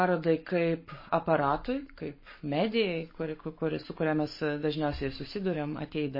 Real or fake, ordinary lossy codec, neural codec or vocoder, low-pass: fake; MP3, 24 kbps; codec, 44.1 kHz, 7.8 kbps, Pupu-Codec; 5.4 kHz